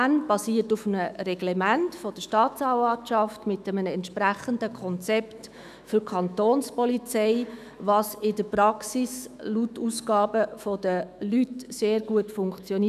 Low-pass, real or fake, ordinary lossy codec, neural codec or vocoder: 14.4 kHz; fake; none; autoencoder, 48 kHz, 128 numbers a frame, DAC-VAE, trained on Japanese speech